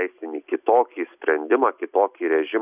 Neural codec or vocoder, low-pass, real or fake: none; 3.6 kHz; real